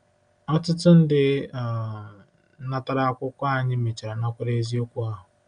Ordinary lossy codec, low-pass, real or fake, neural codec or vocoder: none; 9.9 kHz; real; none